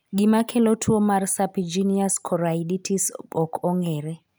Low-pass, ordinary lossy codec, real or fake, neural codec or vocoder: none; none; real; none